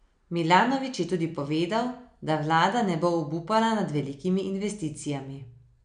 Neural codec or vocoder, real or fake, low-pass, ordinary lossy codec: none; real; 9.9 kHz; none